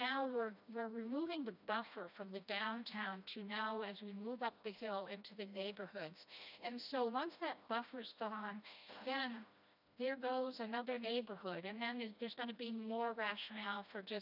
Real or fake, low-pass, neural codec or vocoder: fake; 5.4 kHz; codec, 16 kHz, 1 kbps, FreqCodec, smaller model